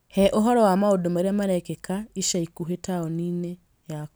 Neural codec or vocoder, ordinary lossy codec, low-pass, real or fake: none; none; none; real